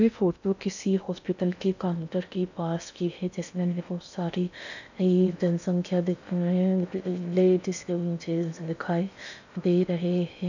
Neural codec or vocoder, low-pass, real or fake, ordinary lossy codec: codec, 16 kHz in and 24 kHz out, 0.6 kbps, FocalCodec, streaming, 2048 codes; 7.2 kHz; fake; none